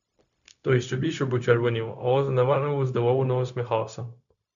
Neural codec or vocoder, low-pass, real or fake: codec, 16 kHz, 0.4 kbps, LongCat-Audio-Codec; 7.2 kHz; fake